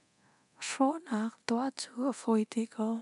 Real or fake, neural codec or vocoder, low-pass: fake; codec, 24 kHz, 0.9 kbps, DualCodec; 10.8 kHz